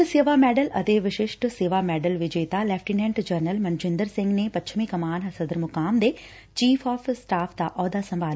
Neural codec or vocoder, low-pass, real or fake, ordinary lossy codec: none; none; real; none